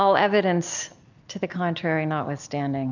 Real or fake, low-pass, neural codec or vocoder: real; 7.2 kHz; none